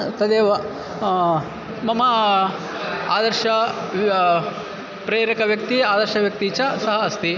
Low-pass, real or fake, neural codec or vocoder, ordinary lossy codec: 7.2 kHz; real; none; none